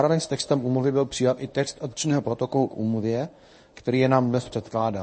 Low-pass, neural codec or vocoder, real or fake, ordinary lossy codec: 10.8 kHz; codec, 24 kHz, 0.9 kbps, WavTokenizer, medium speech release version 1; fake; MP3, 32 kbps